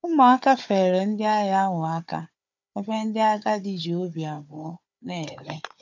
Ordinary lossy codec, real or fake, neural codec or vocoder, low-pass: none; fake; codec, 16 kHz, 4 kbps, FunCodec, trained on Chinese and English, 50 frames a second; 7.2 kHz